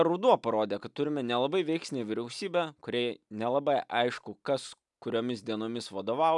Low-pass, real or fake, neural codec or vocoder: 10.8 kHz; real; none